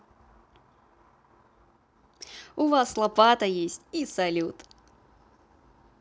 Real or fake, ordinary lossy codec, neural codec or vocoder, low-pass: real; none; none; none